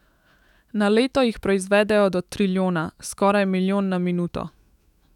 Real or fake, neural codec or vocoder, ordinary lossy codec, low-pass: fake; autoencoder, 48 kHz, 128 numbers a frame, DAC-VAE, trained on Japanese speech; none; 19.8 kHz